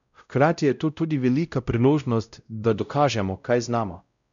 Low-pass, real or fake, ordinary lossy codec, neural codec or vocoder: 7.2 kHz; fake; none; codec, 16 kHz, 0.5 kbps, X-Codec, WavLM features, trained on Multilingual LibriSpeech